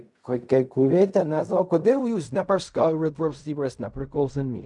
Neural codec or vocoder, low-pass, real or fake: codec, 16 kHz in and 24 kHz out, 0.4 kbps, LongCat-Audio-Codec, fine tuned four codebook decoder; 10.8 kHz; fake